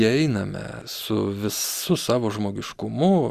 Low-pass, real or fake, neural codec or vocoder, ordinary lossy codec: 14.4 kHz; real; none; Opus, 64 kbps